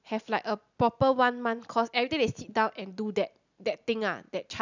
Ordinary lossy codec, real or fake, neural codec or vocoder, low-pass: none; real; none; 7.2 kHz